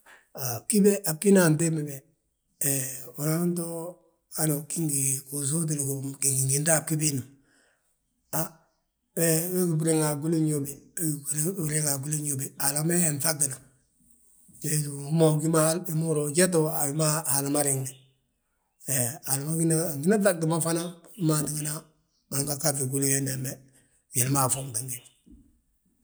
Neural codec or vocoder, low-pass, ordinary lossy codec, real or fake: none; none; none; real